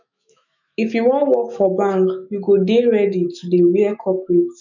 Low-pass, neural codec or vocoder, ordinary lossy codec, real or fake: 7.2 kHz; autoencoder, 48 kHz, 128 numbers a frame, DAC-VAE, trained on Japanese speech; none; fake